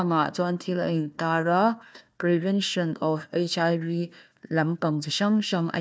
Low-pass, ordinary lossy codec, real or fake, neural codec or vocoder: none; none; fake; codec, 16 kHz, 1 kbps, FunCodec, trained on Chinese and English, 50 frames a second